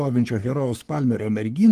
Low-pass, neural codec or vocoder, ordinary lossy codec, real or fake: 14.4 kHz; codec, 44.1 kHz, 3.4 kbps, Pupu-Codec; Opus, 24 kbps; fake